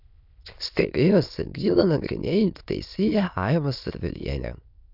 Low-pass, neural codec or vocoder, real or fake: 5.4 kHz; autoencoder, 22.05 kHz, a latent of 192 numbers a frame, VITS, trained on many speakers; fake